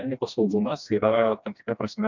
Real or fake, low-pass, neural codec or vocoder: fake; 7.2 kHz; codec, 16 kHz, 1 kbps, FreqCodec, smaller model